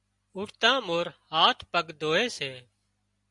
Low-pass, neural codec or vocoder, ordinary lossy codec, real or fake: 10.8 kHz; none; Opus, 64 kbps; real